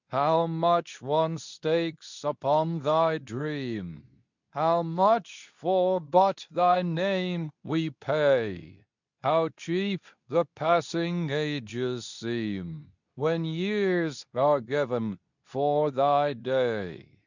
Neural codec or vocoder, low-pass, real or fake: codec, 24 kHz, 0.9 kbps, WavTokenizer, medium speech release version 2; 7.2 kHz; fake